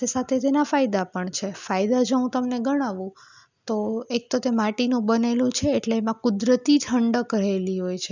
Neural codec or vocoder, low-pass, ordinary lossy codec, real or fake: none; 7.2 kHz; none; real